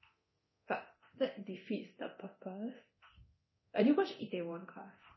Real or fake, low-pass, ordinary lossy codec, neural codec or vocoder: fake; 7.2 kHz; MP3, 24 kbps; codec, 24 kHz, 0.9 kbps, DualCodec